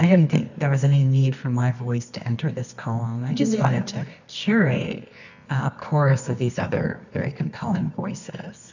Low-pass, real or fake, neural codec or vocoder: 7.2 kHz; fake; codec, 24 kHz, 0.9 kbps, WavTokenizer, medium music audio release